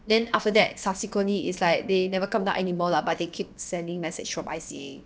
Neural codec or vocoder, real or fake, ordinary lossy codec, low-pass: codec, 16 kHz, about 1 kbps, DyCAST, with the encoder's durations; fake; none; none